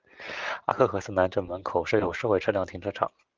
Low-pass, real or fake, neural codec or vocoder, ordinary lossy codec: 7.2 kHz; real; none; Opus, 24 kbps